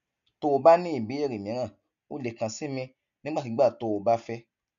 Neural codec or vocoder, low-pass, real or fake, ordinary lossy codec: none; 7.2 kHz; real; none